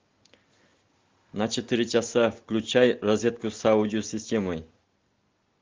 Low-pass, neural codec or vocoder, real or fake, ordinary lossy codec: 7.2 kHz; none; real; Opus, 32 kbps